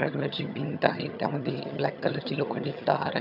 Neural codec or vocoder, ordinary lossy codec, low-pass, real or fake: vocoder, 22.05 kHz, 80 mel bands, HiFi-GAN; none; 5.4 kHz; fake